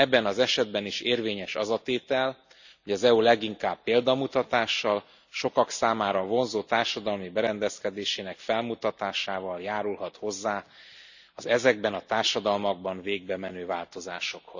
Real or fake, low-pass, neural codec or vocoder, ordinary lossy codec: real; 7.2 kHz; none; none